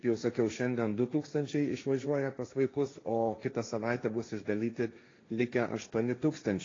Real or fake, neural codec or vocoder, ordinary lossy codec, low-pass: fake; codec, 16 kHz, 1.1 kbps, Voila-Tokenizer; AAC, 32 kbps; 7.2 kHz